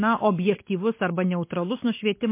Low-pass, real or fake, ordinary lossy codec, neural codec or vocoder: 3.6 kHz; real; MP3, 24 kbps; none